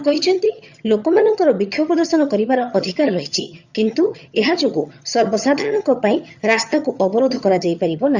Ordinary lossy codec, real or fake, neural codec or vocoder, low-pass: Opus, 64 kbps; fake; vocoder, 22.05 kHz, 80 mel bands, HiFi-GAN; 7.2 kHz